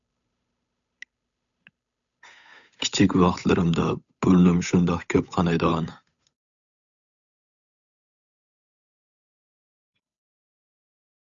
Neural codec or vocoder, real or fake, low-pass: codec, 16 kHz, 8 kbps, FunCodec, trained on Chinese and English, 25 frames a second; fake; 7.2 kHz